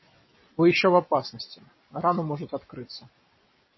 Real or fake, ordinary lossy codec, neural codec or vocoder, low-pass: real; MP3, 24 kbps; none; 7.2 kHz